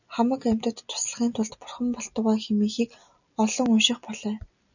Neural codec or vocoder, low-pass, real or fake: none; 7.2 kHz; real